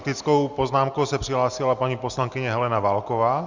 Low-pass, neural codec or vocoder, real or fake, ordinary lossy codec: 7.2 kHz; none; real; Opus, 64 kbps